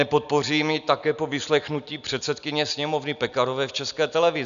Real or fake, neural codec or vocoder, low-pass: real; none; 7.2 kHz